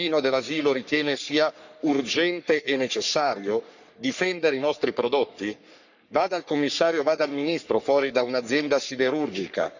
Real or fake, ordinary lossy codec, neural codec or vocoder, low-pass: fake; none; codec, 44.1 kHz, 3.4 kbps, Pupu-Codec; 7.2 kHz